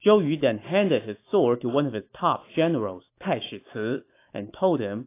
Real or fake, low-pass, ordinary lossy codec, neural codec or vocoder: fake; 3.6 kHz; AAC, 24 kbps; autoencoder, 48 kHz, 128 numbers a frame, DAC-VAE, trained on Japanese speech